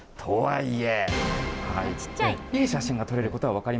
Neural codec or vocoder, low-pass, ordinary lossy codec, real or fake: none; none; none; real